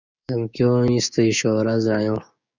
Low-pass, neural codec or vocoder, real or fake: 7.2 kHz; codec, 16 kHz, 6 kbps, DAC; fake